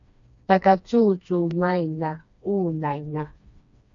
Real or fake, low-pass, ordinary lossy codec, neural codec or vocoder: fake; 7.2 kHz; MP3, 64 kbps; codec, 16 kHz, 2 kbps, FreqCodec, smaller model